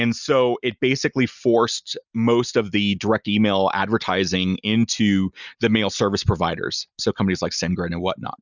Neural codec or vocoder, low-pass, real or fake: none; 7.2 kHz; real